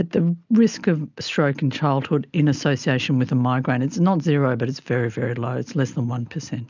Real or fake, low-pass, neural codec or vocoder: real; 7.2 kHz; none